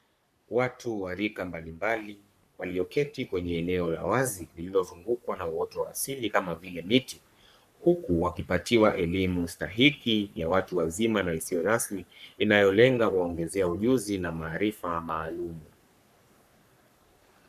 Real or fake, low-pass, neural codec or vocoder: fake; 14.4 kHz; codec, 44.1 kHz, 3.4 kbps, Pupu-Codec